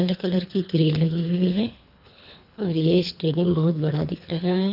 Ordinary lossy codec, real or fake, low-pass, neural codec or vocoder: none; fake; 5.4 kHz; codec, 24 kHz, 3 kbps, HILCodec